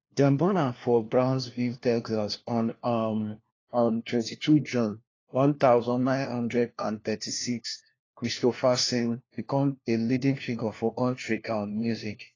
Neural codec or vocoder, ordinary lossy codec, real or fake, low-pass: codec, 16 kHz, 1 kbps, FunCodec, trained on LibriTTS, 50 frames a second; AAC, 32 kbps; fake; 7.2 kHz